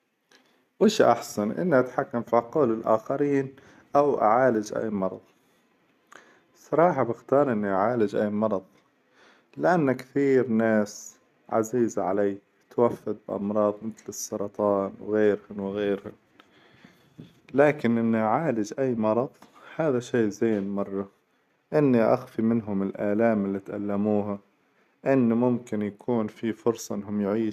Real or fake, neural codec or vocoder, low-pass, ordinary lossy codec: real; none; 14.4 kHz; none